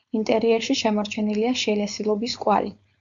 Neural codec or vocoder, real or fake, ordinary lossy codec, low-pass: codec, 16 kHz, 4.8 kbps, FACodec; fake; Opus, 64 kbps; 7.2 kHz